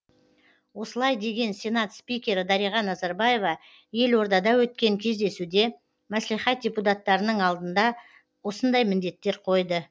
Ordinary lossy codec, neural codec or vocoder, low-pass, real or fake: none; none; none; real